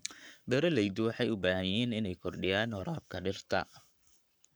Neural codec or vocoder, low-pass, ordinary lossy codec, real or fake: codec, 44.1 kHz, 7.8 kbps, Pupu-Codec; none; none; fake